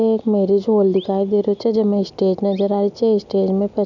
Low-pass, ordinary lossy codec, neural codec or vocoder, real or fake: 7.2 kHz; none; none; real